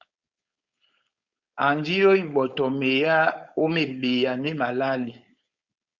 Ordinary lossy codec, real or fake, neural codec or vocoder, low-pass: Opus, 64 kbps; fake; codec, 16 kHz, 4.8 kbps, FACodec; 7.2 kHz